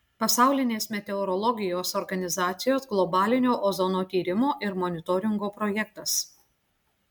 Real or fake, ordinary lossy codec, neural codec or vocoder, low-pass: real; MP3, 96 kbps; none; 19.8 kHz